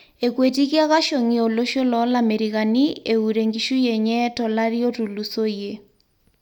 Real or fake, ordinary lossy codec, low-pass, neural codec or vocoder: real; none; 19.8 kHz; none